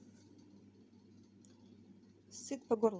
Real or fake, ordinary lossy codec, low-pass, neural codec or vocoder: real; none; none; none